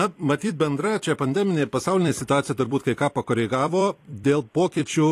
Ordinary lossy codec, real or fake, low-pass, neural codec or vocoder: AAC, 48 kbps; fake; 14.4 kHz; vocoder, 44.1 kHz, 128 mel bands every 256 samples, BigVGAN v2